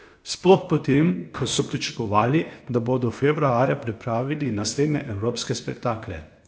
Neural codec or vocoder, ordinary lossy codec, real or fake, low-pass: codec, 16 kHz, 0.8 kbps, ZipCodec; none; fake; none